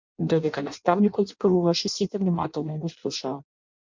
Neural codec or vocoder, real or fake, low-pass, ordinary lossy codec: codec, 16 kHz in and 24 kHz out, 0.6 kbps, FireRedTTS-2 codec; fake; 7.2 kHz; MP3, 64 kbps